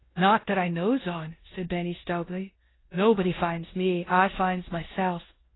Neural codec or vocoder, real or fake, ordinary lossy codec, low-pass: codec, 16 kHz in and 24 kHz out, 0.9 kbps, LongCat-Audio-Codec, four codebook decoder; fake; AAC, 16 kbps; 7.2 kHz